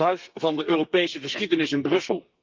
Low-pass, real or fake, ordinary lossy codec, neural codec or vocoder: 7.2 kHz; fake; Opus, 32 kbps; codec, 32 kHz, 1.9 kbps, SNAC